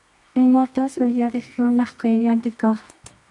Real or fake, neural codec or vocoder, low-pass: fake; codec, 24 kHz, 0.9 kbps, WavTokenizer, medium music audio release; 10.8 kHz